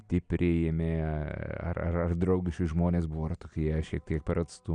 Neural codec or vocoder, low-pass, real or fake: none; 9.9 kHz; real